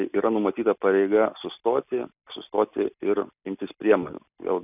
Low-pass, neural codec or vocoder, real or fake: 3.6 kHz; none; real